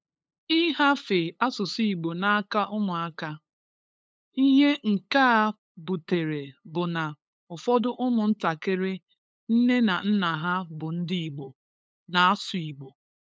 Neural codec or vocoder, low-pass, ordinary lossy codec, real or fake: codec, 16 kHz, 8 kbps, FunCodec, trained on LibriTTS, 25 frames a second; none; none; fake